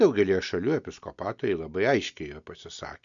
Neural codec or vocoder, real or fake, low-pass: none; real; 7.2 kHz